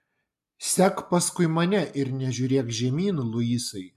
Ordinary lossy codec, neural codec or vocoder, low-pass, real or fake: MP3, 96 kbps; none; 14.4 kHz; real